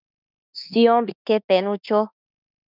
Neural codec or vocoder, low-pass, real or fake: autoencoder, 48 kHz, 32 numbers a frame, DAC-VAE, trained on Japanese speech; 5.4 kHz; fake